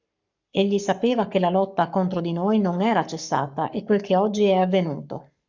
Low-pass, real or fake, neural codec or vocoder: 7.2 kHz; fake; codec, 44.1 kHz, 7.8 kbps, Pupu-Codec